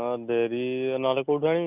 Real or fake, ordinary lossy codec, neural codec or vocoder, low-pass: real; none; none; 3.6 kHz